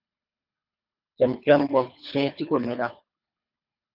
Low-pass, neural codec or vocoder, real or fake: 5.4 kHz; codec, 24 kHz, 3 kbps, HILCodec; fake